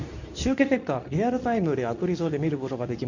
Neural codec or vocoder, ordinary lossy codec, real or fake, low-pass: codec, 24 kHz, 0.9 kbps, WavTokenizer, medium speech release version 2; none; fake; 7.2 kHz